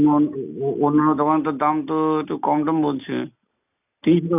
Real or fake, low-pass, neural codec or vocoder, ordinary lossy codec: real; 3.6 kHz; none; none